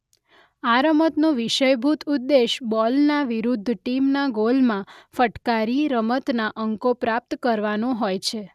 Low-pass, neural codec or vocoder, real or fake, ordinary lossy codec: 14.4 kHz; none; real; none